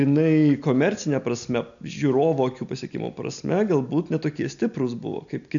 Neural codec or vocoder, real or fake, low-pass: none; real; 7.2 kHz